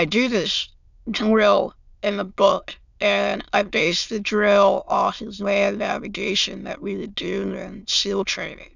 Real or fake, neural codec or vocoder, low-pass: fake; autoencoder, 22.05 kHz, a latent of 192 numbers a frame, VITS, trained on many speakers; 7.2 kHz